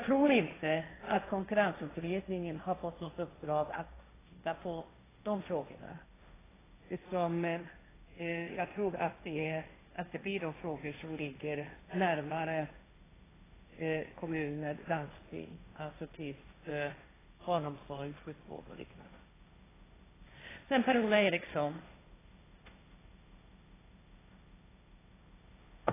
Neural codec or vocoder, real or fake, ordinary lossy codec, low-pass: codec, 16 kHz, 1.1 kbps, Voila-Tokenizer; fake; AAC, 16 kbps; 3.6 kHz